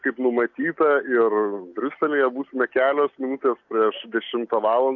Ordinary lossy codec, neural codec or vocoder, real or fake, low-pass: MP3, 48 kbps; none; real; 7.2 kHz